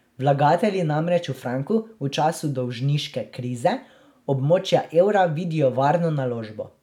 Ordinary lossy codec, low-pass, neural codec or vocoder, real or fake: none; 19.8 kHz; none; real